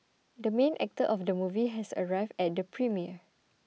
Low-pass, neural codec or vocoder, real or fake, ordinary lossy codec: none; none; real; none